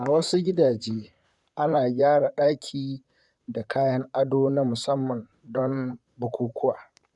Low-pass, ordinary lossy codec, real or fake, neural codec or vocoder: 10.8 kHz; none; fake; vocoder, 44.1 kHz, 128 mel bands, Pupu-Vocoder